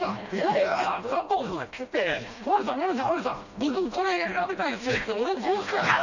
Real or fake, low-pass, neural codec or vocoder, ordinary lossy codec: fake; 7.2 kHz; codec, 16 kHz, 1 kbps, FreqCodec, smaller model; none